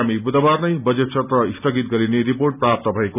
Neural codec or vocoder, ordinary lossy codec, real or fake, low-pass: none; none; real; 3.6 kHz